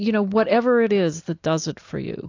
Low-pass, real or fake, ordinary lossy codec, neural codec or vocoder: 7.2 kHz; real; AAC, 48 kbps; none